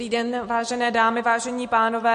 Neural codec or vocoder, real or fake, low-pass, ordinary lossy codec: none; real; 14.4 kHz; MP3, 48 kbps